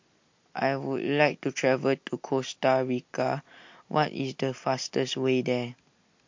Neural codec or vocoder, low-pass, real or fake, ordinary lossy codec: none; 7.2 kHz; real; MP3, 48 kbps